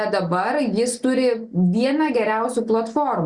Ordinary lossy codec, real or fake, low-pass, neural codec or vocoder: Opus, 64 kbps; real; 10.8 kHz; none